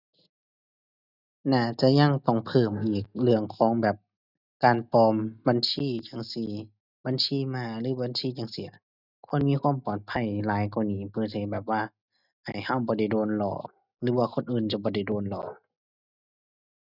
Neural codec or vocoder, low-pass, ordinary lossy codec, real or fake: none; 5.4 kHz; none; real